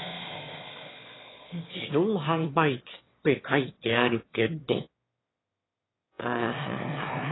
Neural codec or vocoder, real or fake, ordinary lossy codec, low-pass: autoencoder, 22.05 kHz, a latent of 192 numbers a frame, VITS, trained on one speaker; fake; AAC, 16 kbps; 7.2 kHz